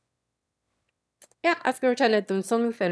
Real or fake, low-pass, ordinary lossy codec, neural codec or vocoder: fake; none; none; autoencoder, 22.05 kHz, a latent of 192 numbers a frame, VITS, trained on one speaker